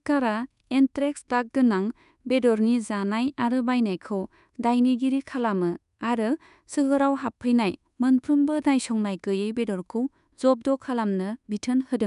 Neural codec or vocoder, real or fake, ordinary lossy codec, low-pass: codec, 24 kHz, 1.2 kbps, DualCodec; fake; none; 10.8 kHz